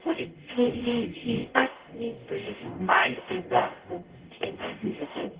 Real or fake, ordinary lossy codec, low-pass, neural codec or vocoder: fake; Opus, 16 kbps; 3.6 kHz; codec, 44.1 kHz, 0.9 kbps, DAC